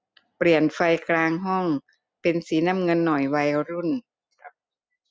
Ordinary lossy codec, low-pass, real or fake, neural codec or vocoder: none; none; real; none